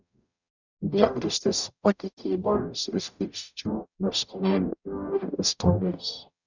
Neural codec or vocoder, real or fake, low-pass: codec, 44.1 kHz, 0.9 kbps, DAC; fake; 7.2 kHz